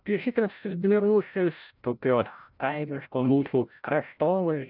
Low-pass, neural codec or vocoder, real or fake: 5.4 kHz; codec, 16 kHz, 0.5 kbps, FreqCodec, larger model; fake